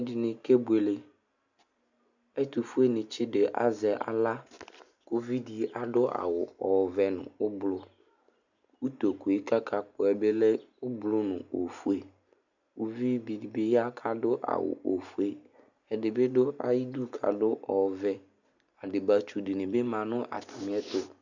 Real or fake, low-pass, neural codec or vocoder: real; 7.2 kHz; none